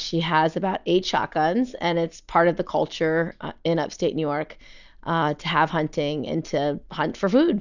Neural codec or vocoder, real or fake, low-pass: none; real; 7.2 kHz